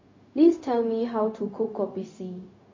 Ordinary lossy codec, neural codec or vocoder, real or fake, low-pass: MP3, 32 kbps; codec, 16 kHz, 0.4 kbps, LongCat-Audio-Codec; fake; 7.2 kHz